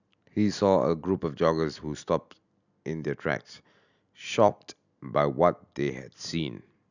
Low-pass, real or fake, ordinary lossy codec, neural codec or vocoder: 7.2 kHz; real; none; none